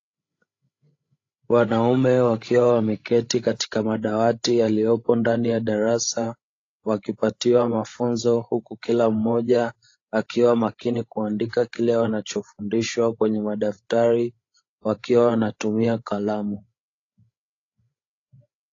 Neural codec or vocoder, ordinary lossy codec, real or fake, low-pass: codec, 16 kHz, 16 kbps, FreqCodec, larger model; AAC, 32 kbps; fake; 7.2 kHz